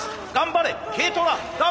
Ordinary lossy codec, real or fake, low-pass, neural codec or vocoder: none; real; none; none